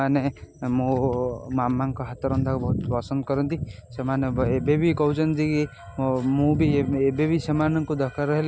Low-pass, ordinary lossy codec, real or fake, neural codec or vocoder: none; none; real; none